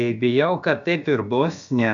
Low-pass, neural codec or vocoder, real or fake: 7.2 kHz; codec, 16 kHz, about 1 kbps, DyCAST, with the encoder's durations; fake